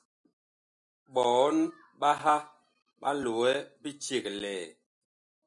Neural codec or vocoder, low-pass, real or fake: none; 10.8 kHz; real